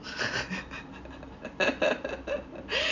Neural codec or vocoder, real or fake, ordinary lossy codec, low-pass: none; real; none; 7.2 kHz